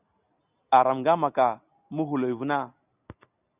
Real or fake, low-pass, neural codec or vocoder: real; 3.6 kHz; none